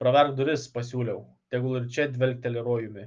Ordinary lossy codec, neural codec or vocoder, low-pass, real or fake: Opus, 24 kbps; none; 7.2 kHz; real